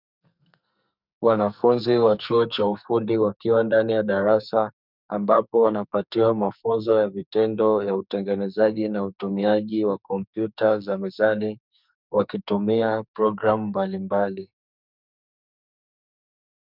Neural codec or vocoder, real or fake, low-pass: codec, 44.1 kHz, 2.6 kbps, SNAC; fake; 5.4 kHz